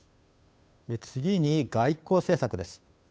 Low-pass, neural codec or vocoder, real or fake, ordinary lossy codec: none; codec, 16 kHz, 2 kbps, FunCodec, trained on Chinese and English, 25 frames a second; fake; none